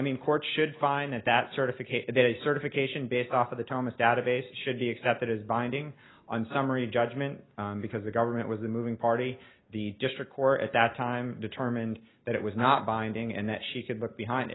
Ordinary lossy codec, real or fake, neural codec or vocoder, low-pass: AAC, 16 kbps; real; none; 7.2 kHz